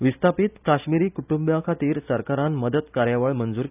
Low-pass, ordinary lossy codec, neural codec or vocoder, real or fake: 3.6 kHz; none; none; real